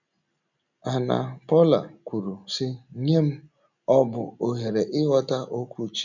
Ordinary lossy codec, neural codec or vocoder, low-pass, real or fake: none; none; 7.2 kHz; real